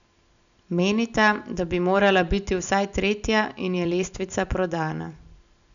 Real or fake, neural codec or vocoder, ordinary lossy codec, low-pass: real; none; none; 7.2 kHz